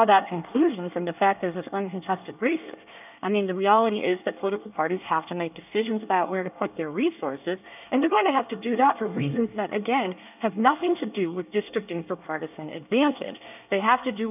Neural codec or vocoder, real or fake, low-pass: codec, 24 kHz, 1 kbps, SNAC; fake; 3.6 kHz